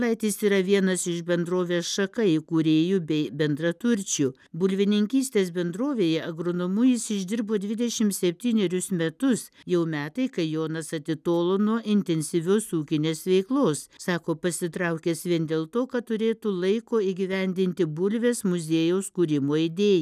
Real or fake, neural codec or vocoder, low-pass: real; none; 14.4 kHz